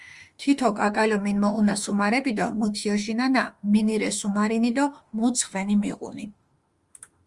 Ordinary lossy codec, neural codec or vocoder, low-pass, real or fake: Opus, 32 kbps; autoencoder, 48 kHz, 32 numbers a frame, DAC-VAE, trained on Japanese speech; 10.8 kHz; fake